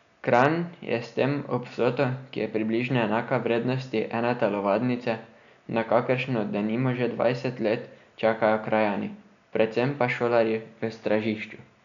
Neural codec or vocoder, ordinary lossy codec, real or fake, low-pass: none; none; real; 7.2 kHz